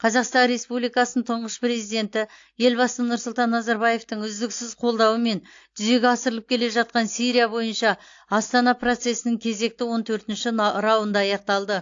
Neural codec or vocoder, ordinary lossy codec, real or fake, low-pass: none; AAC, 48 kbps; real; 7.2 kHz